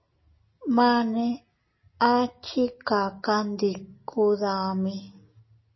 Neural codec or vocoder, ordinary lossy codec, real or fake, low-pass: none; MP3, 24 kbps; real; 7.2 kHz